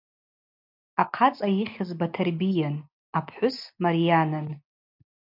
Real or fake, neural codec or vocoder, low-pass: real; none; 5.4 kHz